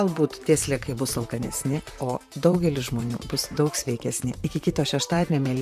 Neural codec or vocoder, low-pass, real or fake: vocoder, 44.1 kHz, 128 mel bands, Pupu-Vocoder; 14.4 kHz; fake